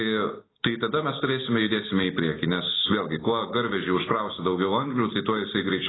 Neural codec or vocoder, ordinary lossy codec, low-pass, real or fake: none; AAC, 16 kbps; 7.2 kHz; real